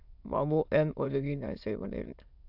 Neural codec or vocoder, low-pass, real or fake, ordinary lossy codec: autoencoder, 22.05 kHz, a latent of 192 numbers a frame, VITS, trained on many speakers; 5.4 kHz; fake; AAC, 48 kbps